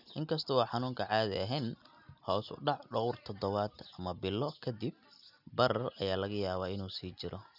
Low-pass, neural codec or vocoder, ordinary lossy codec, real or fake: 5.4 kHz; none; none; real